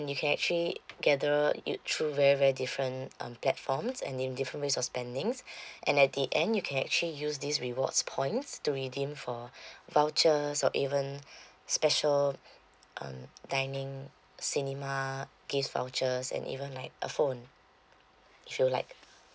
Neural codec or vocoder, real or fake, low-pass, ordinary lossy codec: none; real; none; none